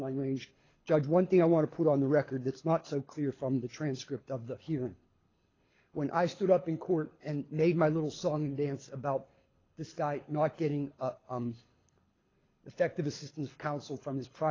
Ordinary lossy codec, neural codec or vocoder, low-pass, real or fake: AAC, 32 kbps; codec, 24 kHz, 6 kbps, HILCodec; 7.2 kHz; fake